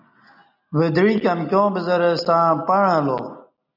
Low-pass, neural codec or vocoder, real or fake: 5.4 kHz; none; real